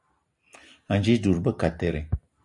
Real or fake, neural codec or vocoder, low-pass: real; none; 10.8 kHz